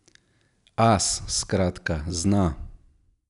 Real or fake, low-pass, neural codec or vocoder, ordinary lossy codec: real; 10.8 kHz; none; none